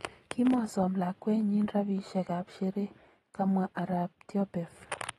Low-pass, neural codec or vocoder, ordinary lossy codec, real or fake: 19.8 kHz; none; AAC, 32 kbps; real